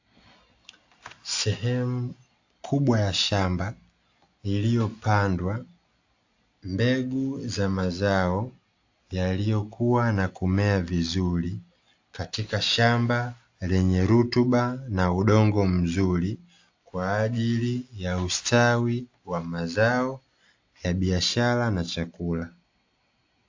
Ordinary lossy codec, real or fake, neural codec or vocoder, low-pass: AAC, 48 kbps; real; none; 7.2 kHz